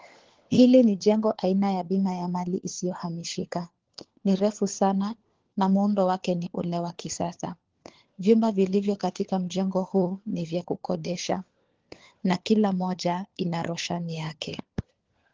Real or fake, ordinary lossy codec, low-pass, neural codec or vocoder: fake; Opus, 16 kbps; 7.2 kHz; codec, 16 kHz, 4 kbps, FunCodec, trained on LibriTTS, 50 frames a second